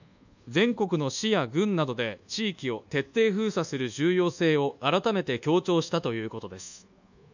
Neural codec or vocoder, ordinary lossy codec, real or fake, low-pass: codec, 24 kHz, 1.2 kbps, DualCodec; none; fake; 7.2 kHz